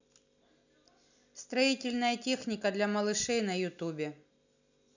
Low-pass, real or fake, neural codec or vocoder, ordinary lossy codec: 7.2 kHz; real; none; none